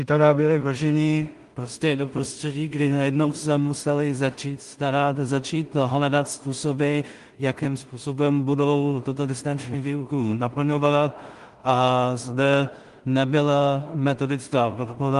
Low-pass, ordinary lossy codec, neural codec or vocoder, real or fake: 10.8 kHz; Opus, 24 kbps; codec, 16 kHz in and 24 kHz out, 0.4 kbps, LongCat-Audio-Codec, two codebook decoder; fake